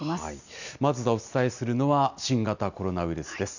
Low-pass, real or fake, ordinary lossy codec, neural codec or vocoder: 7.2 kHz; real; none; none